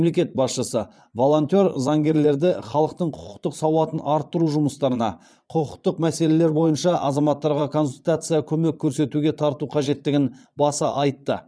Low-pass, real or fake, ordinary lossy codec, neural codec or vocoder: none; fake; none; vocoder, 22.05 kHz, 80 mel bands, Vocos